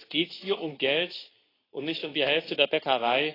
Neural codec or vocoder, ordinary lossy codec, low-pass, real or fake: codec, 24 kHz, 0.9 kbps, WavTokenizer, medium speech release version 2; AAC, 24 kbps; 5.4 kHz; fake